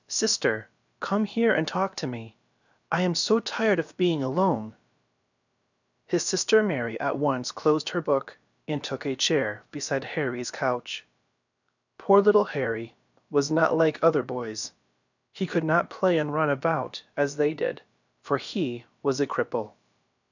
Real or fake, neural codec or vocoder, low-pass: fake; codec, 16 kHz, about 1 kbps, DyCAST, with the encoder's durations; 7.2 kHz